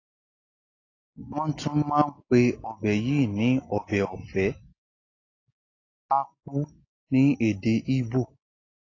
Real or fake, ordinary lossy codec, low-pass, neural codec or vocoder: real; AAC, 32 kbps; 7.2 kHz; none